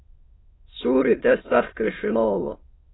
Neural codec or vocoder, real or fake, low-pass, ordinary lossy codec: autoencoder, 22.05 kHz, a latent of 192 numbers a frame, VITS, trained on many speakers; fake; 7.2 kHz; AAC, 16 kbps